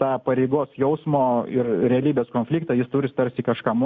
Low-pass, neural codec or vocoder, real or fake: 7.2 kHz; none; real